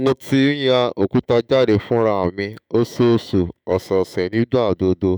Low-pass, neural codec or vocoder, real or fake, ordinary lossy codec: none; autoencoder, 48 kHz, 128 numbers a frame, DAC-VAE, trained on Japanese speech; fake; none